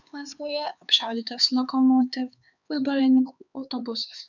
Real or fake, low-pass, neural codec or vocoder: fake; 7.2 kHz; codec, 16 kHz, 4 kbps, X-Codec, HuBERT features, trained on LibriSpeech